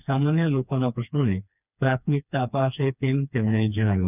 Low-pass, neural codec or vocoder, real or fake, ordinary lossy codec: 3.6 kHz; codec, 16 kHz, 2 kbps, FreqCodec, smaller model; fake; none